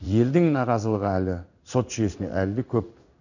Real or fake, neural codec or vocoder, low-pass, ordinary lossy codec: fake; codec, 16 kHz in and 24 kHz out, 1 kbps, XY-Tokenizer; 7.2 kHz; none